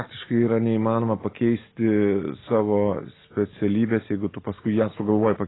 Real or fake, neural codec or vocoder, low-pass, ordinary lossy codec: real; none; 7.2 kHz; AAC, 16 kbps